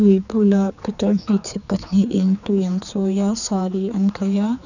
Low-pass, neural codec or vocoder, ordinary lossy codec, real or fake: 7.2 kHz; codec, 16 kHz, 4 kbps, X-Codec, HuBERT features, trained on general audio; none; fake